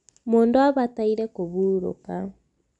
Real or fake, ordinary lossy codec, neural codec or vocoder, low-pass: real; none; none; 10.8 kHz